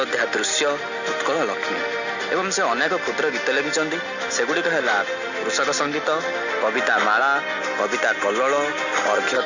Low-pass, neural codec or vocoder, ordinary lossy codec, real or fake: 7.2 kHz; none; none; real